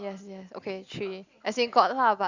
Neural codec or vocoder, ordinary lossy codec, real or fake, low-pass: none; Opus, 64 kbps; real; 7.2 kHz